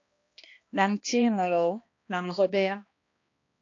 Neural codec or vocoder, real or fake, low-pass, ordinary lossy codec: codec, 16 kHz, 1 kbps, X-Codec, HuBERT features, trained on balanced general audio; fake; 7.2 kHz; AAC, 48 kbps